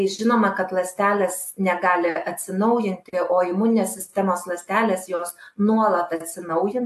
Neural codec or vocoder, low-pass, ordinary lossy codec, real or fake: none; 14.4 kHz; AAC, 64 kbps; real